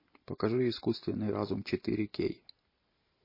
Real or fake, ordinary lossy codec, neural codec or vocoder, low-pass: real; MP3, 24 kbps; none; 5.4 kHz